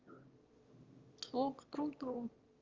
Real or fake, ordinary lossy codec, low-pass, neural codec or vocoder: fake; Opus, 32 kbps; 7.2 kHz; autoencoder, 22.05 kHz, a latent of 192 numbers a frame, VITS, trained on one speaker